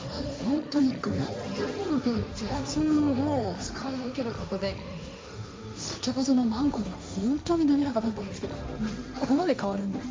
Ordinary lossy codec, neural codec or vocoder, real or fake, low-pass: none; codec, 16 kHz, 1.1 kbps, Voila-Tokenizer; fake; none